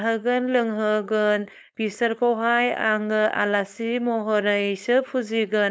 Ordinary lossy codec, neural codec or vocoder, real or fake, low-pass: none; codec, 16 kHz, 4.8 kbps, FACodec; fake; none